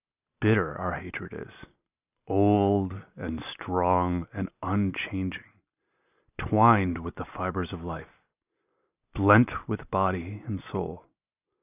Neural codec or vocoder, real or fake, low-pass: none; real; 3.6 kHz